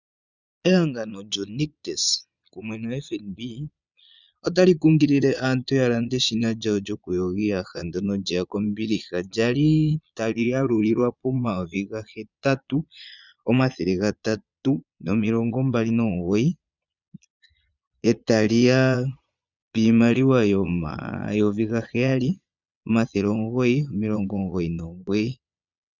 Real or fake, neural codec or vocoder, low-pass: fake; vocoder, 22.05 kHz, 80 mel bands, Vocos; 7.2 kHz